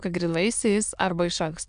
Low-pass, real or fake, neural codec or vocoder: 9.9 kHz; fake; autoencoder, 22.05 kHz, a latent of 192 numbers a frame, VITS, trained on many speakers